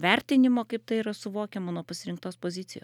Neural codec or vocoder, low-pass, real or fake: none; 19.8 kHz; real